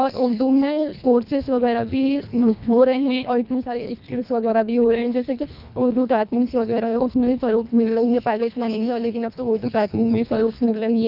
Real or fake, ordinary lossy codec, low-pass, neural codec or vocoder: fake; none; 5.4 kHz; codec, 24 kHz, 1.5 kbps, HILCodec